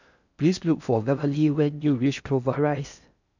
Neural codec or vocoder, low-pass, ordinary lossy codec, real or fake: codec, 16 kHz in and 24 kHz out, 0.6 kbps, FocalCodec, streaming, 4096 codes; 7.2 kHz; none; fake